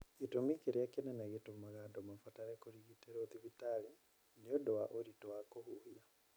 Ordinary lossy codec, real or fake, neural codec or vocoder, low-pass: none; real; none; none